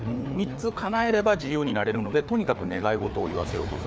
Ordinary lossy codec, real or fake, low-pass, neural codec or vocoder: none; fake; none; codec, 16 kHz, 4 kbps, FreqCodec, larger model